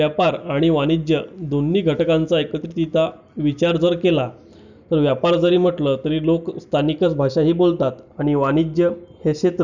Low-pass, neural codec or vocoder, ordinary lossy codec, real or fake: 7.2 kHz; none; none; real